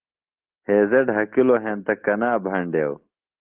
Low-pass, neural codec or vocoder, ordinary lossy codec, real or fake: 3.6 kHz; none; Opus, 16 kbps; real